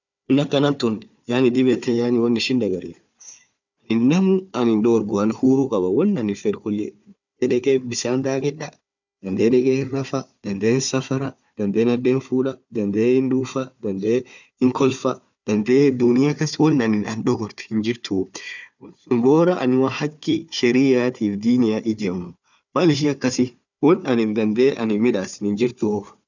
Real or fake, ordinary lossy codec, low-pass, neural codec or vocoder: fake; none; 7.2 kHz; codec, 16 kHz, 4 kbps, FunCodec, trained on Chinese and English, 50 frames a second